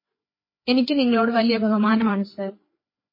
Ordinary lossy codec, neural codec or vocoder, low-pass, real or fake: MP3, 24 kbps; codec, 16 kHz, 4 kbps, FreqCodec, larger model; 5.4 kHz; fake